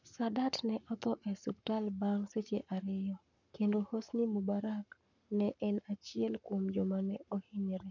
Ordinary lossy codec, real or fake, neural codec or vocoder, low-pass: none; fake; codec, 44.1 kHz, 7.8 kbps, Pupu-Codec; 7.2 kHz